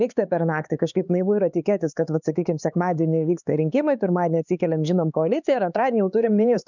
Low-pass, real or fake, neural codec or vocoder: 7.2 kHz; fake; codec, 16 kHz, 4 kbps, X-Codec, HuBERT features, trained on LibriSpeech